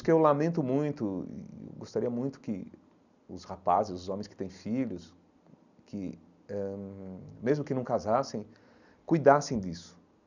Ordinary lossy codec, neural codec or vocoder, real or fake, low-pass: none; none; real; 7.2 kHz